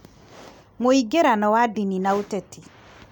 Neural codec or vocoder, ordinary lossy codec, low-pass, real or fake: none; none; 19.8 kHz; real